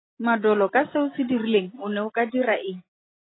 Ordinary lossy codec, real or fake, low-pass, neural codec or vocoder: AAC, 16 kbps; real; 7.2 kHz; none